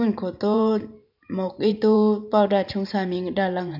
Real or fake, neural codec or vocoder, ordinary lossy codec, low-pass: fake; vocoder, 44.1 kHz, 128 mel bands every 512 samples, BigVGAN v2; none; 5.4 kHz